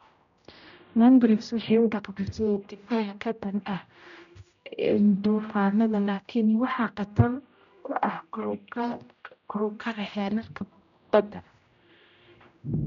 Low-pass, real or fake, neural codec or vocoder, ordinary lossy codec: 7.2 kHz; fake; codec, 16 kHz, 0.5 kbps, X-Codec, HuBERT features, trained on general audio; none